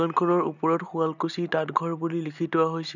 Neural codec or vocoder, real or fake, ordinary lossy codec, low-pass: none; real; none; 7.2 kHz